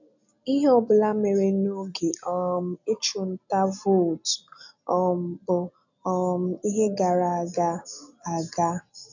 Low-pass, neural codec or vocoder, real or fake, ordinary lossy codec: 7.2 kHz; none; real; none